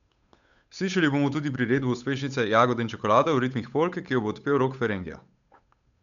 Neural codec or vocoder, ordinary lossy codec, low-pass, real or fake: codec, 16 kHz, 8 kbps, FunCodec, trained on Chinese and English, 25 frames a second; none; 7.2 kHz; fake